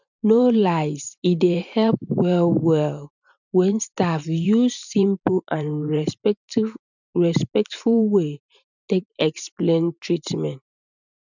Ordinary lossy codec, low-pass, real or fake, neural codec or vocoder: none; 7.2 kHz; fake; vocoder, 44.1 kHz, 128 mel bands every 512 samples, BigVGAN v2